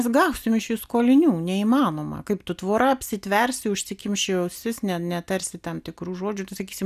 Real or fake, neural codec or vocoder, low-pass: real; none; 14.4 kHz